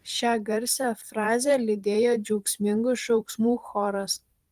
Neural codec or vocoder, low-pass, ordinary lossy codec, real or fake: vocoder, 44.1 kHz, 128 mel bands every 512 samples, BigVGAN v2; 14.4 kHz; Opus, 24 kbps; fake